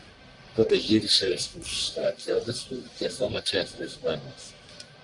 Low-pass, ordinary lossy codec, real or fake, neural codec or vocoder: 10.8 kHz; Opus, 64 kbps; fake; codec, 44.1 kHz, 1.7 kbps, Pupu-Codec